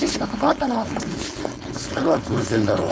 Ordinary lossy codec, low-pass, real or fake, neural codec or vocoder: none; none; fake; codec, 16 kHz, 4.8 kbps, FACodec